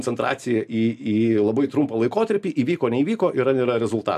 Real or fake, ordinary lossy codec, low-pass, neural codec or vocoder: real; AAC, 96 kbps; 14.4 kHz; none